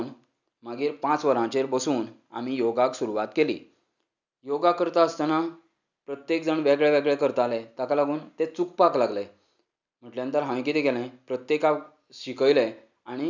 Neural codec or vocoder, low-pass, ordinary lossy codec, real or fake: none; 7.2 kHz; none; real